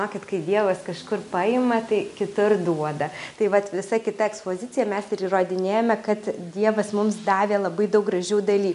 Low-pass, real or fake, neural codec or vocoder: 10.8 kHz; real; none